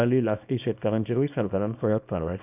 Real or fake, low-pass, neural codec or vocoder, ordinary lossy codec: fake; 3.6 kHz; codec, 24 kHz, 0.9 kbps, WavTokenizer, small release; none